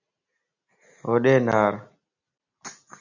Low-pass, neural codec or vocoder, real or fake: 7.2 kHz; none; real